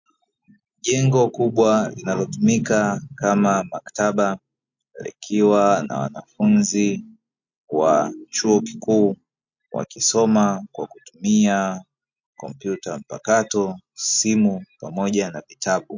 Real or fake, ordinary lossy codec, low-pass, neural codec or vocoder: real; MP3, 48 kbps; 7.2 kHz; none